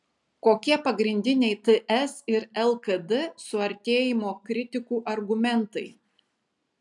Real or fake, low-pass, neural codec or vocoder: real; 10.8 kHz; none